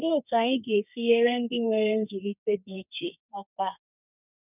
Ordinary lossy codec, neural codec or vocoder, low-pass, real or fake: none; codec, 32 kHz, 1.9 kbps, SNAC; 3.6 kHz; fake